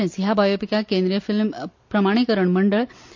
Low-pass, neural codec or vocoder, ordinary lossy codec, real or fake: 7.2 kHz; none; MP3, 48 kbps; real